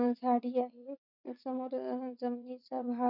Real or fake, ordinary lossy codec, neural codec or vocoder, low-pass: fake; none; vocoder, 22.05 kHz, 80 mel bands, WaveNeXt; 5.4 kHz